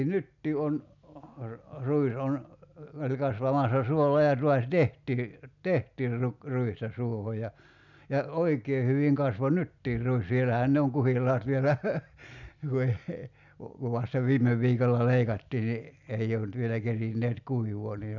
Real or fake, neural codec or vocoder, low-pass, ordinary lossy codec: real; none; 7.2 kHz; none